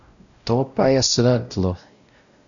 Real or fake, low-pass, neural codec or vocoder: fake; 7.2 kHz; codec, 16 kHz, 0.5 kbps, X-Codec, WavLM features, trained on Multilingual LibriSpeech